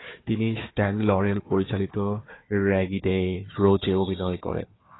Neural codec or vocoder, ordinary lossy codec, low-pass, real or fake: codec, 16 kHz, 4 kbps, FunCodec, trained on Chinese and English, 50 frames a second; AAC, 16 kbps; 7.2 kHz; fake